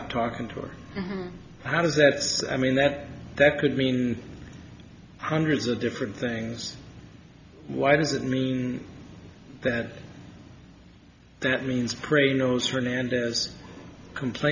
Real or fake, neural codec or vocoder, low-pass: real; none; 7.2 kHz